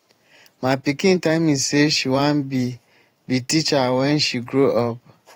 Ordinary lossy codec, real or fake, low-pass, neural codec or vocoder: AAC, 48 kbps; fake; 19.8 kHz; vocoder, 44.1 kHz, 128 mel bands every 512 samples, BigVGAN v2